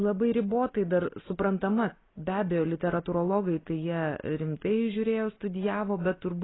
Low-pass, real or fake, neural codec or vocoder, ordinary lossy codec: 7.2 kHz; real; none; AAC, 16 kbps